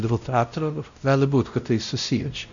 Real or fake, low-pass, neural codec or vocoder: fake; 7.2 kHz; codec, 16 kHz, 0.5 kbps, X-Codec, WavLM features, trained on Multilingual LibriSpeech